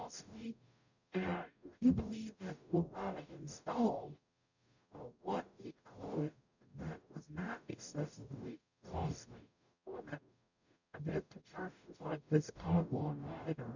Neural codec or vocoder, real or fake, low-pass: codec, 44.1 kHz, 0.9 kbps, DAC; fake; 7.2 kHz